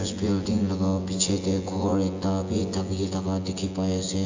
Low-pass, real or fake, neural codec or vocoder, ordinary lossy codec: 7.2 kHz; fake; vocoder, 24 kHz, 100 mel bands, Vocos; AAC, 48 kbps